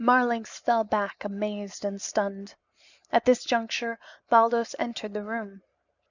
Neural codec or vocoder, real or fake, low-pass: none; real; 7.2 kHz